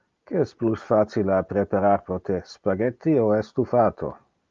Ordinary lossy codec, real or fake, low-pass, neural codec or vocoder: Opus, 32 kbps; real; 7.2 kHz; none